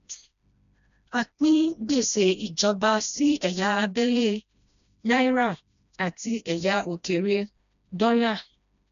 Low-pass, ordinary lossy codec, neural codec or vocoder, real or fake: 7.2 kHz; none; codec, 16 kHz, 1 kbps, FreqCodec, smaller model; fake